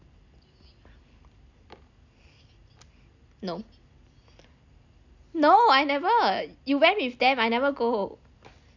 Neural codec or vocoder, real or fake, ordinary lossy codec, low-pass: none; real; none; 7.2 kHz